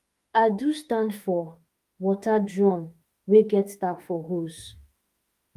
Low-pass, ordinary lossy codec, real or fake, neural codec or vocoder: 14.4 kHz; Opus, 32 kbps; fake; autoencoder, 48 kHz, 32 numbers a frame, DAC-VAE, trained on Japanese speech